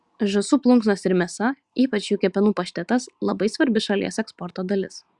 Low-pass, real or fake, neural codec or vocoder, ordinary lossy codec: 10.8 kHz; real; none; Opus, 64 kbps